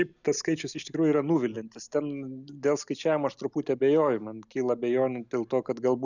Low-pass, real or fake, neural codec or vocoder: 7.2 kHz; real; none